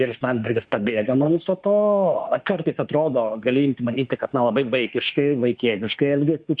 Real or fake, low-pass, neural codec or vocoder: fake; 9.9 kHz; autoencoder, 48 kHz, 32 numbers a frame, DAC-VAE, trained on Japanese speech